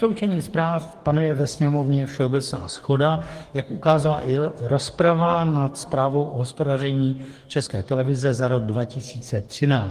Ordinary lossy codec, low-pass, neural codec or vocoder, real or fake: Opus, 24 kbps; 14.4 kHz; codec, 44.1 kHz, 2.6 kbps, DAC; fake